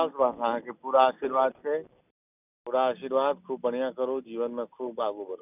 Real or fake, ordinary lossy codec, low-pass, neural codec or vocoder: real; none; 3.6 kHz; none